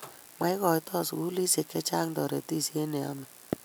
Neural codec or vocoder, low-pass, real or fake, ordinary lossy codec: none; none; real; none